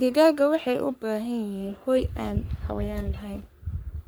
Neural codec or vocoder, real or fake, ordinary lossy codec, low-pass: codec, 44.1 kHz, 3.4 kbps, Pupu-Codec; fake; none; none